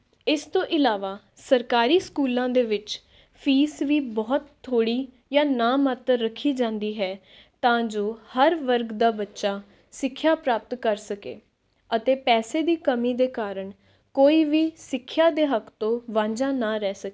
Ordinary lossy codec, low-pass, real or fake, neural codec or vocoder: none; none; real; none